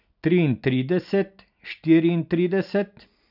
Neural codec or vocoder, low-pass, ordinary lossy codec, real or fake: none; 5.4 kHz; none; real